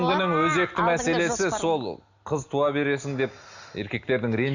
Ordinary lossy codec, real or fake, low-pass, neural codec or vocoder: none; real; 7.2 kHz; none